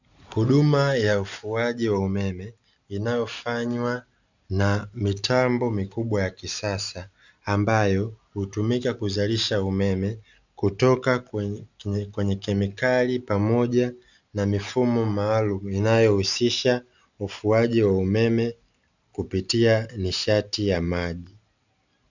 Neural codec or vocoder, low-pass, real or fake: none; 7.2 kHz; real